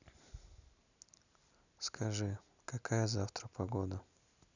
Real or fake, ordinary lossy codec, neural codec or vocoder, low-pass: real; none; none; 7.2 kHz